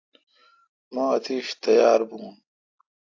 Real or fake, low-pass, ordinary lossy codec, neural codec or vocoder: real; 7.2 kHz; AAC, 48 kbps; none